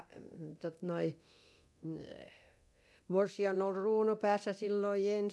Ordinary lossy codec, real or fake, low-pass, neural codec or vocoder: none; fake; none; codec, 24 kHz, 0.9 kbps, DualCodec